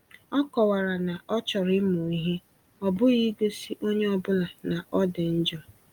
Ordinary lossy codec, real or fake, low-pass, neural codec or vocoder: Opus, 32 kbps; real; 19.8 kHz; none